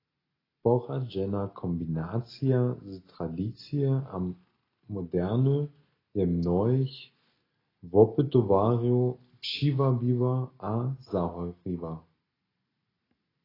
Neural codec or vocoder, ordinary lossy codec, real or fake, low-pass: none; AAC, 24 kbps; real; 5.4 kHz